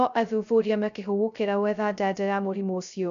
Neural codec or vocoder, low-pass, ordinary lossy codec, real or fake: codec, 16 kHz, 0.2 kbps, FocalCodec; 7.2 kHz; none; fake